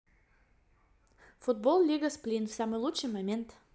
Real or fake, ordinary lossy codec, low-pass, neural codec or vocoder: real; none; none; none